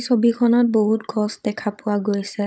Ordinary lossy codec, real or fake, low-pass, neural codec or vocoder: none; fake; none; codec, 16 kHz, 16 kbps, FunCodec, trained on Chinese and English, 50 frames a second